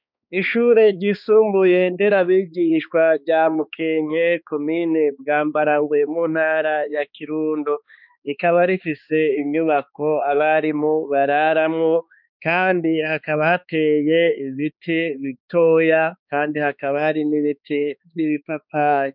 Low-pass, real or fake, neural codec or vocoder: 5.4 kHz; fake; codec, 16 kHz, 2 kbps, X-Codec, HuBERT features, trained on balanced general audio